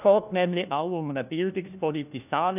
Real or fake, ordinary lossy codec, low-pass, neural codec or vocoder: fake; none; 3.6 kHz; codec, 16 kHz, 1 kbps, FunCodec, trained on LibriTTS, 50 frames a second